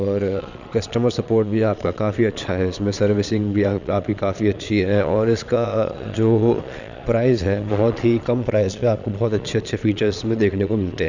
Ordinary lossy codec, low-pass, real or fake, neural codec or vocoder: none; 7.2 kHz; fake; vocoder, 22.05 kHz, 80 mel bands, Vocos